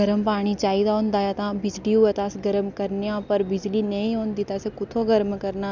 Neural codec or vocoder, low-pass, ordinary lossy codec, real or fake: none; 7.2 kHz; none; real